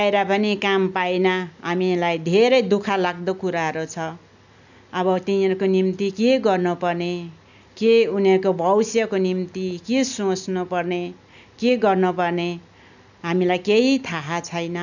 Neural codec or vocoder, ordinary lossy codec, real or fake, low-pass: none; none; real; 7.2 kHz